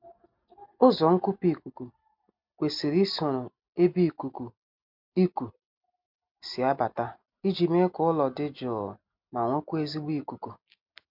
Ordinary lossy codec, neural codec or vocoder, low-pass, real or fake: MP3, 48 kbps; none; 5.4 kHz; real